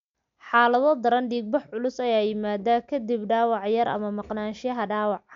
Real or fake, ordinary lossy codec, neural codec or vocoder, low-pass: real; MP3, 64 kbps; none; 7.2 kHz